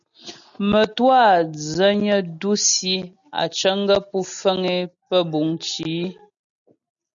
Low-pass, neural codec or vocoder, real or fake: 7.2 kHz; none; real